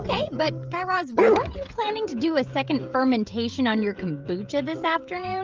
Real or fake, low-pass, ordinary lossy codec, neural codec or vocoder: fake; 7.2 kHz; Opus, 24 kbps; codec, 16 kHz, 16 kbps, FreqCodec, smaller model